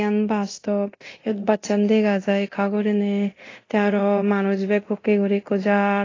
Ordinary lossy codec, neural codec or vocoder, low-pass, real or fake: AAC, 32 kbps; codec, 24 kHz, 0.9 kbps, DualCodec; 7.2 kHz; fake